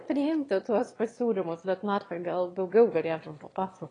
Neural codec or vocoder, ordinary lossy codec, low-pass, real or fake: autoencoder, 22.05 kHz, a latent of 192 numbers a frame, VITS, trained on one speaker; AAC, 32 kbps; 9.9 kHz; fake